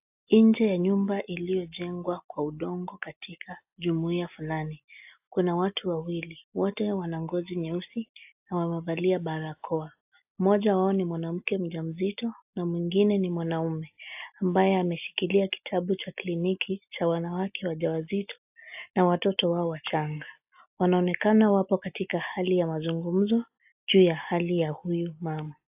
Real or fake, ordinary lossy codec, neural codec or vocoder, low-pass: real; AAC, 32 kbps; none; 3.6 kHz